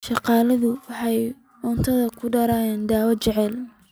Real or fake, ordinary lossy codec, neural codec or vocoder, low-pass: real; none; none; none